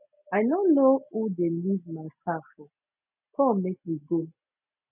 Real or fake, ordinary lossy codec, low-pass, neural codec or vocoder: real; none; 3.6 kHz; none